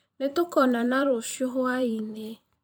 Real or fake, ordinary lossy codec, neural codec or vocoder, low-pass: fake; none; vocoder, 44.1 kHz, 128 mel bands every 512 samples, BigVGAN v2; none